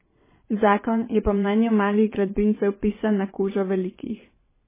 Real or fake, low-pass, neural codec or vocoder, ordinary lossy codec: fake; 3.6 kHz; vocoder, 22.05 kHz, 80 mel bands, WaveNeXt; MP3, 16 kbps